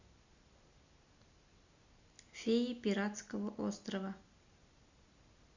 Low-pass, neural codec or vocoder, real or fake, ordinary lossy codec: 7.2 kHz; none; real; Opus, 64 kbps